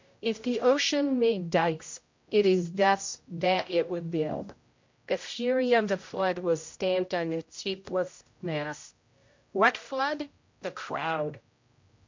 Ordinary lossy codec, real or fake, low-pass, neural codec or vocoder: MP3, 48 kbps; fake; 7.2 kHz; codec, 16 kHz, 0.5 kbps, X-Codec, HuBERT features, trained on general audio